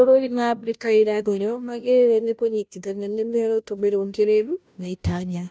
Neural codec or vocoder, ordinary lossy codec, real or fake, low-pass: codec, 16 kHz, 0.5 kbps, FunCodec, trained on Chinese and English, 25 frames a second; none; fake; none